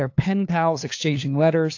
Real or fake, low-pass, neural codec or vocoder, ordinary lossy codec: fake; 7.2 kHz; codec, 16 kHz, 2 kbps, FunCodec, trained on LibriTTS, 25 frames a second; AAC, 48 kbps